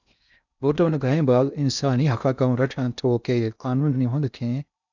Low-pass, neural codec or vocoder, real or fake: 7.2 kHz; codec, 16 kHz in and 24 kHz out, 0.6 kbps, FocalCodec, streaming, 2048 codes; fake